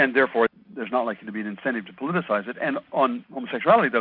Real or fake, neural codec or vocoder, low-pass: real; none; 5.4 kHz